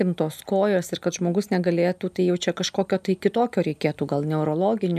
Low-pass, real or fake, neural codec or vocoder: 14.4 kHz; real; none